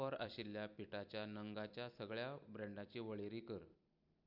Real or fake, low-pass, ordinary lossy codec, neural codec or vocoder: fake; 5.4 kHz; MP3, 48 kbps; vocoder, 44.1 kHz, 128 mel bands every 256 samples, BigVGAN v2